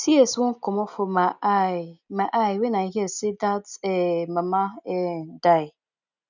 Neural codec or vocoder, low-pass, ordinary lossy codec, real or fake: none; 7.2 kHz; none; real